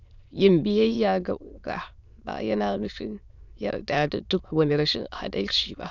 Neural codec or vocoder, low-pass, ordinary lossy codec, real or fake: autoencoder, 22.05 kHz, a latent of 192 numbers a frame, VITS, trained on many speakers; 7.2 kHz; none; fake